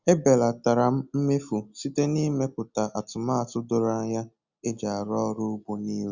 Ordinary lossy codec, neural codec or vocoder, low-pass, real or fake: Opus, 64 kbps; none; 7.2 kHz; real